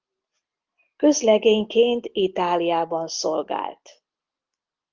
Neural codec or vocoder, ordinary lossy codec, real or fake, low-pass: none; Opus, 24 kbps; real; 7.2 kHz